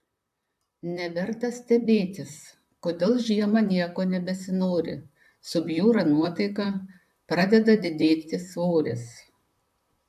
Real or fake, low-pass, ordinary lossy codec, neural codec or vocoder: fake; 14.4 kHz; AAC, 96 kbps; vocoder, 44.1 kHz, 128 mel bands, Pupu-Vocoder